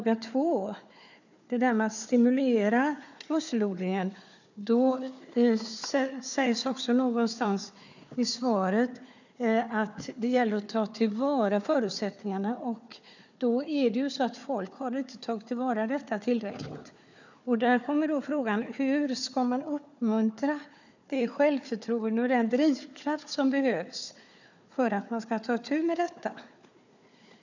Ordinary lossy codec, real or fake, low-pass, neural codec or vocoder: none; fake; 7.2 kHz; codec, 16 kHz, 4 kbps, FunCodec, trained on Chinese and English, 50 frames a second